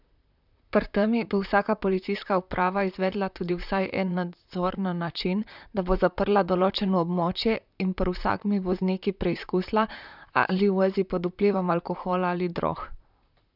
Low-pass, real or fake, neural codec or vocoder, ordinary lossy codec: 5.4 kHz; fake; vocoder, 44.1 kHz, 128 mel bands, Pupu-Vocoder; none